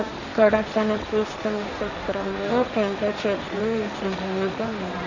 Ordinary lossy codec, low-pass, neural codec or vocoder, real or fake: none; 7.2 kHz; codec, 16 kHz, 1.1 kbps, Voila-Tokenizer; fake